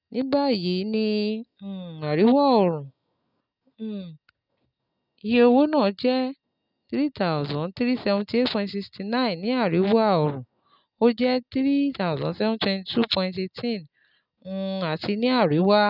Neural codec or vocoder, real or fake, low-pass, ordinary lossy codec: none; real; 5.4 kHz; none